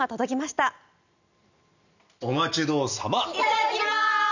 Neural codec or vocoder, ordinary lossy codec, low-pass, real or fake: none; none; 7.2 kHz; real